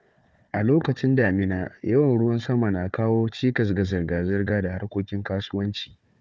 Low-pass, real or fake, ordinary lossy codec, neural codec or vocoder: none; fake; none; codec, 16 kHz, 4 kbps, FunCodec, trained on Chinese and English, 50 frames a second